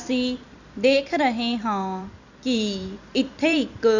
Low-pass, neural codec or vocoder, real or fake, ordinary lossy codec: 7.2 kHz; vocoder, 44.1 kHz, 128 mel bands every 256 samples, BigVGAN v2; fake; none